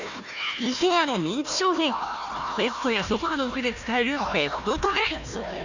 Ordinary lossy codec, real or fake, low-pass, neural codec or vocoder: none; fake; 7.2 kHz; codec, 16 kHz, 1 kbps, FunCodec, trained on Chinese and English, 50 frames a second